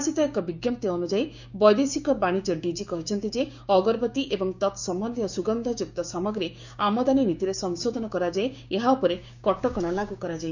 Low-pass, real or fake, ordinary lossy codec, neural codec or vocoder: 7.2 kHz; fake; none; codec, 16 kHz, 6 kbps, DAC